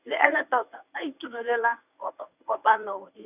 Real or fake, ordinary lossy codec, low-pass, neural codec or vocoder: fake; none; 3.6 kHz; codec, 24 kHz, 0.9 kbps, WavTokenizer, medium speech release version 1